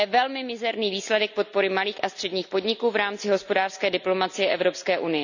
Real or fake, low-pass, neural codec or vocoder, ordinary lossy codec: real; 7.2 kHz; none; none